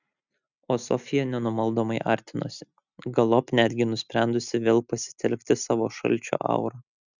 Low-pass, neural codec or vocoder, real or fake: 7.2 kHz; none; real